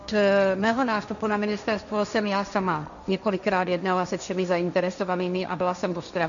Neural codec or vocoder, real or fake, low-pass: codec, 16 kHz, 1.1 kbps, Voila-Tokenizer; fake; 7.2 kHz